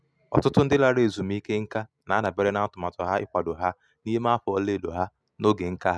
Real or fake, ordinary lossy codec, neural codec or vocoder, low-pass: real; none; none; none